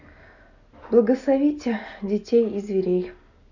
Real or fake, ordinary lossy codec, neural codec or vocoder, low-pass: real; none; none; 7.2 kHz